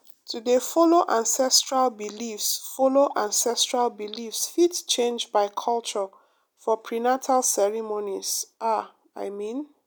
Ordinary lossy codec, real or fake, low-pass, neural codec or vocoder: none; real; none; none